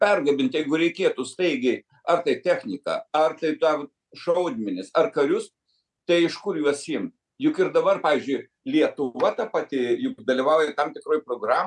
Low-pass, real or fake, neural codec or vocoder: 10.8 kHz; real; none